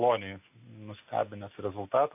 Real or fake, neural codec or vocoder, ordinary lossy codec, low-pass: real; none; AAC, 32 kbps; 3.6 kHz